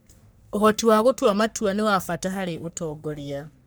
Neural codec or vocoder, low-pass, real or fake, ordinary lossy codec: codec, 44.1 kHz, 3.4 kbps, Pupu-Codec; none; fake; none